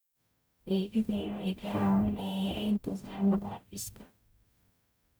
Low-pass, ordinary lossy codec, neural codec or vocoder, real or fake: none; none; codec, 44.1 kHz, 0.9 kbps, DAC; fake